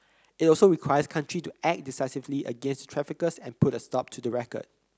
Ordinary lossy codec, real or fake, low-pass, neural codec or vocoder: none; real; none; none